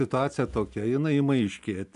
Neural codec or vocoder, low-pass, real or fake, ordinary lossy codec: none; 10.8 kHz; real; AAC, 96 kbps